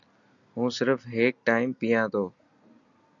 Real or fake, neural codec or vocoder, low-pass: real; none; 7.2 kHz